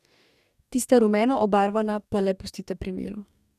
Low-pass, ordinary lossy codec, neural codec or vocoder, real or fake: 14.4 kHz; none; codec, 44.1 kHz, 2.6 kbps, DAC; fake